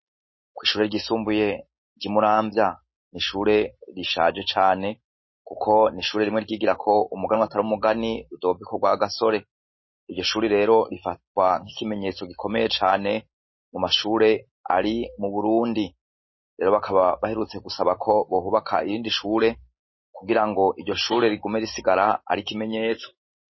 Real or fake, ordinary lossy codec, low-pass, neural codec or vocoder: real; MP3, 24 kbps; 7.2 kHz; none